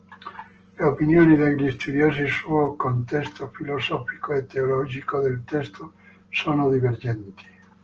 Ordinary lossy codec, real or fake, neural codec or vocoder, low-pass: Opus, 16 kbps; real; none; 7.2 kHz